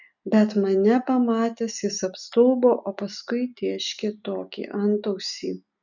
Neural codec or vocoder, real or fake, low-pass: none; real; 7.2 kHz